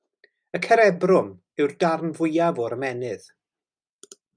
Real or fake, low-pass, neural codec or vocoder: real; 9.9 kHz; none